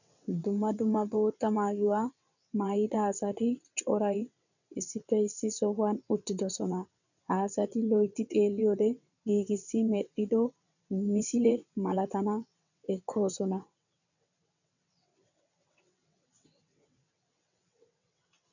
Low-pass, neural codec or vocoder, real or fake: 7.2 kHz; vocoder, 22.05 kHz, 80 mel bands, WaveNeXt; fake